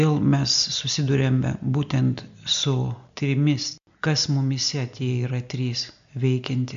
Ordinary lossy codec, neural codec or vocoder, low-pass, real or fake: MP3, 64 kbps; none; 7.2 kHz; real